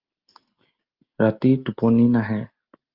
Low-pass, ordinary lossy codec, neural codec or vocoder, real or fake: 5.4 kHz; Opus, 24 kbps; none; real